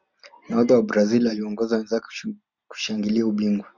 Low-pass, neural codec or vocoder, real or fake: 7.2 kHz; none; real